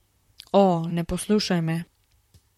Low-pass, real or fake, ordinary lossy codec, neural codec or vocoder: 19.8 kHz; fake; MP3, 64 kbps; vocoder, 44.1 kHz, 128 mel bands, Pupu-Vocoder